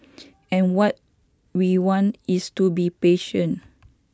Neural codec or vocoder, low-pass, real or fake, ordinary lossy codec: none; none; real; none